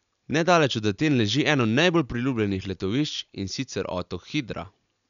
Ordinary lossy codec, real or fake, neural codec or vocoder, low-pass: none; real; none; 7.2 kHz